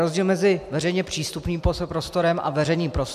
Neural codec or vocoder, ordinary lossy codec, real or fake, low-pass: none; MP3, 96 kbps; real; 14.4 kHz